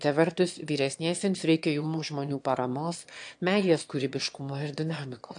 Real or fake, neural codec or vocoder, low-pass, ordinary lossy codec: fake; autoencoder, 22.05 kHz, a latent of 192 numbers a frame, VITS, trained on one speaker; 9.9 kHz; AAC, 64 kbps